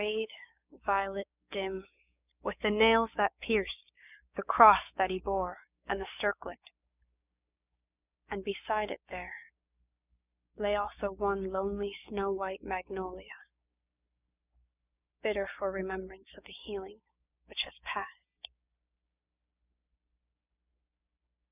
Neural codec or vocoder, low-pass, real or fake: codec, 16 kHz, 6 kbps, DAC; 3.6 kHz; fake